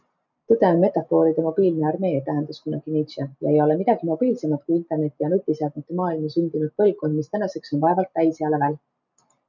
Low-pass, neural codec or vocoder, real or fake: 7.2 kHz; none; real